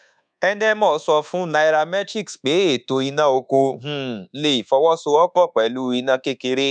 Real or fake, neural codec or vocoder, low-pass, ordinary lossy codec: fake; codec, 24 kHz, 1.2 kbps, DualCodec; 9.9 kHz; none